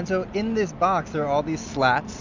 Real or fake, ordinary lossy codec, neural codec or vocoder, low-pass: real; Opus, 64 kbps; none; 7.2 kHz